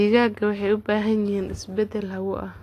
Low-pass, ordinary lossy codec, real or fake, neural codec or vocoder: 14.4 kHz; AAC, 48 kbps; real; none